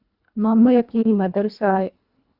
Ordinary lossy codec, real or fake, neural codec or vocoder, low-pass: Opus, 64 kbps; fake; codec, 24 kHz, 1.5 kbps, HILCodec; 5.4 kHz